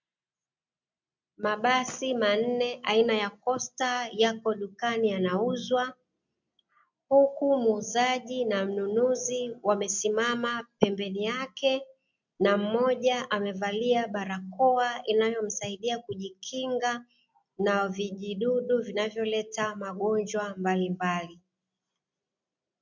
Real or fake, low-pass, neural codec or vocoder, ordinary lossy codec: real; 7.2 kHz; none; MP3, 64 kbps